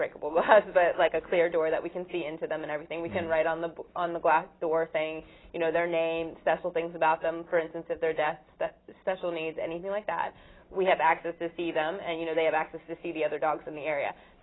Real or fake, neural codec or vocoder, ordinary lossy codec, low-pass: real; none; AAC, 16 kbps; 7.2 kHz